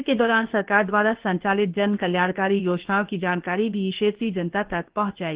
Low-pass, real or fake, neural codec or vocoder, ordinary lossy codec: 3.6 kHz; fake; codec, 16 kHz, about 1 kbps, DyCAST, with the encoder's durations; Opus, 16 kbps